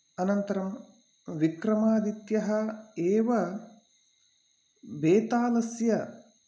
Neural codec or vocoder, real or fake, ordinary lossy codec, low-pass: none; real; none; none